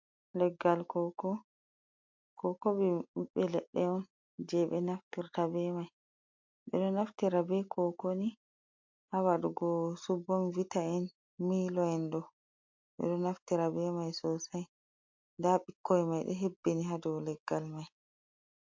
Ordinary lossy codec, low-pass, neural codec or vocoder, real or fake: MP3, 48 kbps; 7.2 kHz; none; real